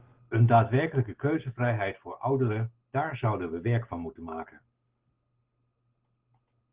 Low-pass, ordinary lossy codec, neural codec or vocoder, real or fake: 3.6 kHz; Opus, 16 kbps; none; real